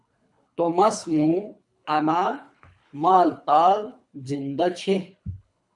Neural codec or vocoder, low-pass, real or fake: codec, 24 kHz, 3 kbps, HILCodec; 10.8 kHz; fake